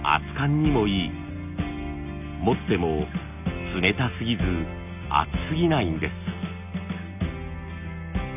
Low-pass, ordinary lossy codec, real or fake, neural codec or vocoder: 3.6 kHz; none; real; none